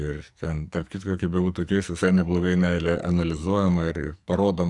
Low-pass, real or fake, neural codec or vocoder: 10.8 kHz; fake; codec, 44.1 kHz, 2.6 kbps, SNAC